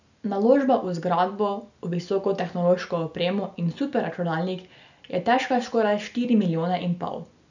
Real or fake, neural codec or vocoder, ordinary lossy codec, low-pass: real; none; none; 7.2 kHz